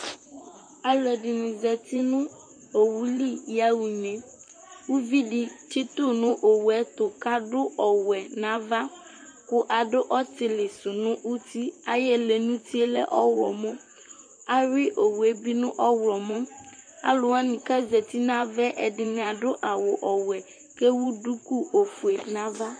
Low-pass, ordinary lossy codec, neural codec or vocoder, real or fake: 9.9 kHz; MP3, 48 kbps; vocoder, 44.1 kHz, 128 mel bands, Pupu-Vocoder; fake